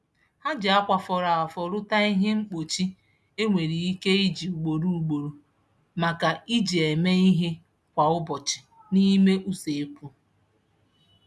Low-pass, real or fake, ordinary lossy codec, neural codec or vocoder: none; real; none; none